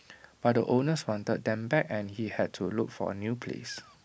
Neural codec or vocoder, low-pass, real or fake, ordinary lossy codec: none; none; real; none